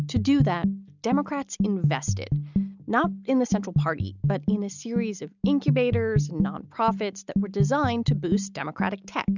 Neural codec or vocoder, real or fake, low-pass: none; real; 7.2 kHz